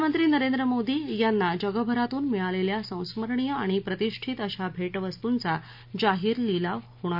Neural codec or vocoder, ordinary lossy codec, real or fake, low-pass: none; MP3, 48 kbps; real; 5.4 kHz